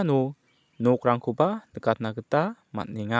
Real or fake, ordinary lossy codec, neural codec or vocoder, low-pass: real; none; none; none